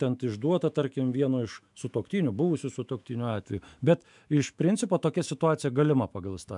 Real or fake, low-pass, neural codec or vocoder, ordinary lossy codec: fake; 10.8 kHz; autoencoder, 48 kHz, 128 numbers a frame, DAC-VAE, trained on Japanese speech; MP3, 96 kbps